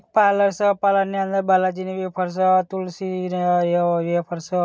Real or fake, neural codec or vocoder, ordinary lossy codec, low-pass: real; none; none; none